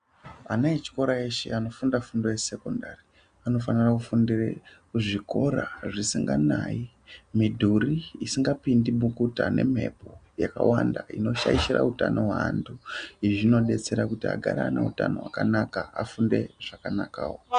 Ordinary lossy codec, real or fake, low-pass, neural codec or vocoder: AAC, 96 kbps; real; 9.9 kHz; none